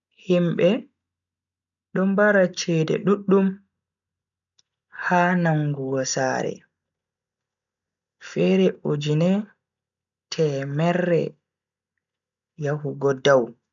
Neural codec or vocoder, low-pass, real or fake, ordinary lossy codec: none; 7.2 kHz; real; none